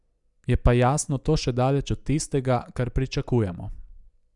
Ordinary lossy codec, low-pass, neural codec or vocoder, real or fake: none; 10.8 kHz; none; real